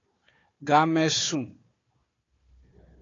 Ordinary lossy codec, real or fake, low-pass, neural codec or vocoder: AAC, 32 kbps; fake; 7.2 kHz; codec, 16 kHz, 4 kbps, FunCodec, trained on Chinese and English, 50 frames a second